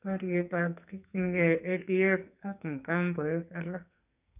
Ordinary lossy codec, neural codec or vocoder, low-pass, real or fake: none; codec, 44.1 kHz, 2.6 kbps, SNAC; 3.6 kHz; fake